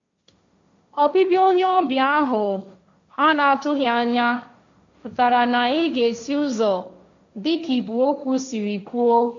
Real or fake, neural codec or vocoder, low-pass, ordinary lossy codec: fake; codec, 16 kHz, 1.1 kbps, Voila-Tokenizer; 7.2 kHz; AAC, 64 kbps